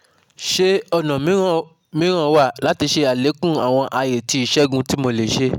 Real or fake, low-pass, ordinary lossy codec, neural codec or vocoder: real; 19.8 kHz; none; none